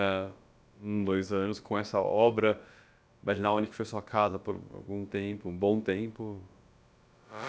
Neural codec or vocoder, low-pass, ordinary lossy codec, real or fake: codec, 16 kHz, about 1 kbps, DyCAST, with the encoder's durations; none; none; fake